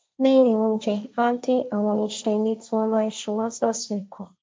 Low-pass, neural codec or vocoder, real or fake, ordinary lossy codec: none; codec, 16 kHz, 1.1 kbps, Voila-Tokenizer; fake; none